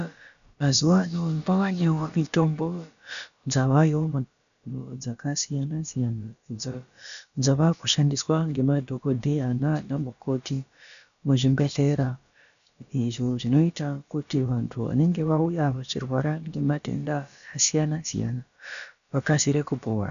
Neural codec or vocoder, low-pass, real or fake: codec, 16 kHz, about 1 kbps, DyCAST, with the encoder's durations; 7.2 kHz; fake